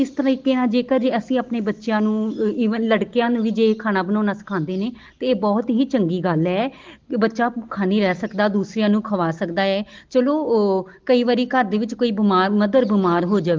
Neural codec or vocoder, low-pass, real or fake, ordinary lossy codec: none; 7.2 kHz; real; Opus, 16 kbps